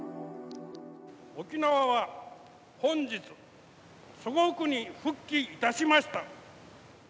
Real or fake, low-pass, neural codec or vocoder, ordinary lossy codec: real; none; none; none